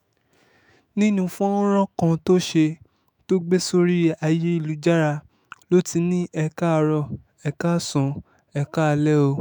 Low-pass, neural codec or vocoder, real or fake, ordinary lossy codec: none; autoencoder, 48 kHz, 128 numbers a frame, DAC-VAE, trained on Japanese speech; fake; none